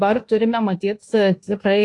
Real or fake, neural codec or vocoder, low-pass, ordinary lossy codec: fake; codec, 24 kHz, 1.2 kbps, DualCodec; 10.8 kHz; AAC, 48 kbps